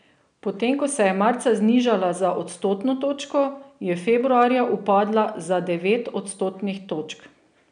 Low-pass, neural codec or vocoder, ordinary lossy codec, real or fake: 9.9 kHz; none; none; real